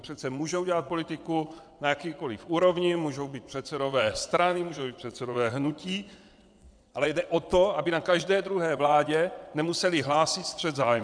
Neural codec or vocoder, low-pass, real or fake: vocoder, 22.05 kHz, 80 mel bands, WaveNeXt; 9.9 kHz; fake